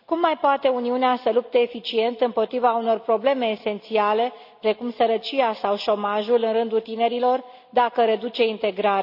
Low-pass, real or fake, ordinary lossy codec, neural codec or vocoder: 5.4 kHz; real; AAC, 48 kbps; none